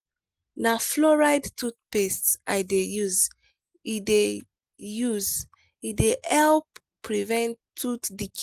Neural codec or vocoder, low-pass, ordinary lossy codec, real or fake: none; 14.4 kHz; Opus, 24 kbps; real